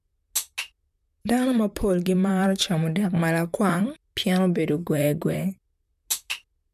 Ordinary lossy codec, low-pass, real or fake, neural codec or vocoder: none; 14.4 kHz; fake; vocoder, 44.1 kHz, 128 mel bands, Pupu-Vocoder